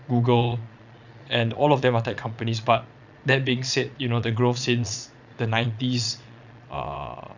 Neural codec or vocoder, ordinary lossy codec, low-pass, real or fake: vocoder, 22.05 kHz, 80 mel bands, Vocos; none; 7.2 kHz; fake